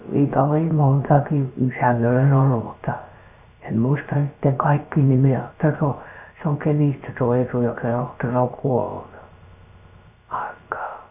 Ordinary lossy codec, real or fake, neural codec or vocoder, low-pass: none; fake; codec, 16 kHz, about 1 kbps, DyCAST, with the encoder's durations; 3.6 kHz